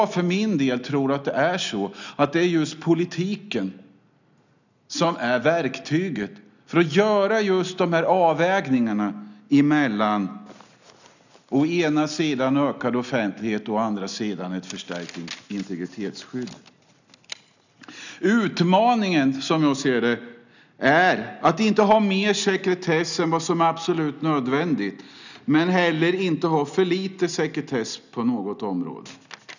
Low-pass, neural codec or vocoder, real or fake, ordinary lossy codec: 7.2 kHz; none; real; none